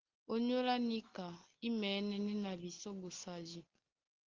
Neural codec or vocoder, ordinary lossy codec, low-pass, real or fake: none; Opus, 16 kbps; 7.2 kHz; real